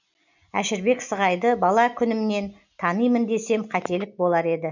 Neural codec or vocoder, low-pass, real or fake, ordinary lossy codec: none; 7.2 kHz; real; none